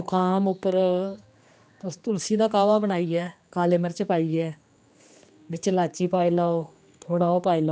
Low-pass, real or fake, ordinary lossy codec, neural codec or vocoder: none; fake; none; codec, 16 kHz, 2 kbps, X-Codec, HuBERT features, trained on general audio